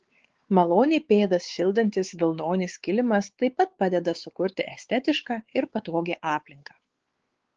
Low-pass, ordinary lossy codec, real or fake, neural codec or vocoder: 7.2 kHz; Opus, 16 kbps; fake; codec, 16 kHz, 4 kbps, X-Codec, WavLM features, trained on Multilingual LibriSpeech